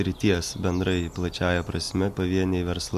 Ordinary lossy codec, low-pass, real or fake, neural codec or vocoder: MP3, 96 kbps; 14.4 kHz; real; none